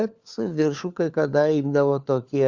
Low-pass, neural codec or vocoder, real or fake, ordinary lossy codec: 7.2 kHz; codec, 16 kHz, 4 kbps, FunCodec, trained on LibriTTS, 50 frames a second; fake; Opus, 64 kbps